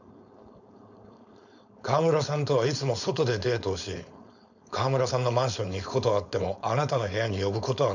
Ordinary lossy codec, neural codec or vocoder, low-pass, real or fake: none; codec, 16 kHz, 4.8 kbps, FACodec; 7.2 kHz; fake